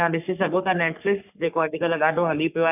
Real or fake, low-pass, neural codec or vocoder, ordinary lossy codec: fake; 3.6 kHz; codec, 44.1 kHz, 3.4 kbps, Pupu-Codec; none